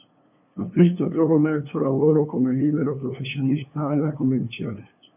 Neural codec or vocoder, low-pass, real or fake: codec, 16 kHz, 2 kbps, FunCodec, trained on LibriTTS, 25 frames a second; 3.6 kHz; fake